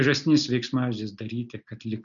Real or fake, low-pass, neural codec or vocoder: real; 7.2 kHz; none